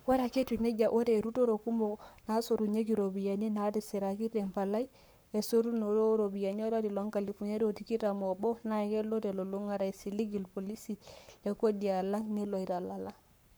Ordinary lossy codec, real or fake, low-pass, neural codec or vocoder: none; fake; none; codec, 44.1 kHz, 7.8 kbps, Pupu-Codec